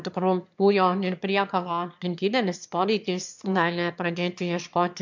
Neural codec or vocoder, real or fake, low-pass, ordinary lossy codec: autoencoder, 22.05 kHz, a latent of 192 numbers a frame, VITS, trained on one speaker; fake; 7.2 kHz; MP3, 64 kbps